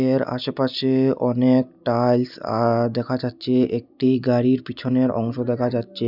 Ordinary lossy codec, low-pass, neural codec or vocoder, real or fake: none; 5.4 kHz; none; real